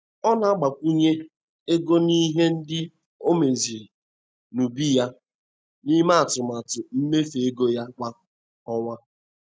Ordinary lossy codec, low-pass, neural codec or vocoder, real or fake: none; none; none; real